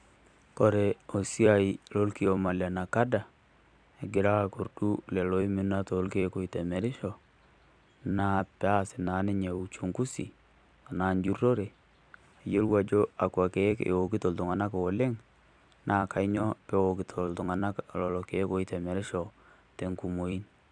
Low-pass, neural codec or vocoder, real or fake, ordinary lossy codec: 9.9 kHz; vocoder, 44.1 kHz, 128 mel bands every 256 samples, BigVGAN v2; fake; none